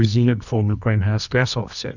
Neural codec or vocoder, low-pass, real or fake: codec, 24 kHz, 0.9 kbps, WavTokenizer, medium music audio release; 7.2 kHz; fake